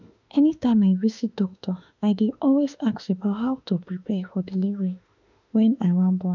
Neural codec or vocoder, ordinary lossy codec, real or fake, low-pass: autoencoder, 48 kHz, 32 numbers a frame, DAC-VAE, trained on Japanese speech; none; fake; 7.2 kHz